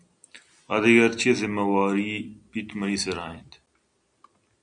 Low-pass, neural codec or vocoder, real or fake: 9.9 kHz; none; real